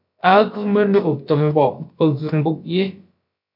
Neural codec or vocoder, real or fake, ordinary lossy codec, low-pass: codec, 16 kHz, about 1 kbps, DyCAST, with the encoder's durations; fake; MP3, 48 kbps; 5.4 kHz